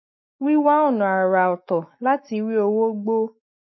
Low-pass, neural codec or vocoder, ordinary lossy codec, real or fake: 7.2 kHz; autoencoder, 48 kHz, 128 numbers a frame, DAC-VAE, trained on Japanese speech; MP3, 24 kbps; fake